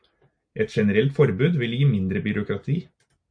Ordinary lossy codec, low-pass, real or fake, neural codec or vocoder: AAC, 64 kbps; 9.9 kHz; real; none